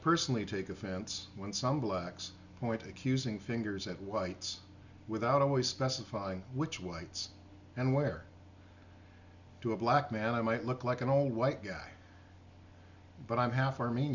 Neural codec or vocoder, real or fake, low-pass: none; real; 7.2 kHz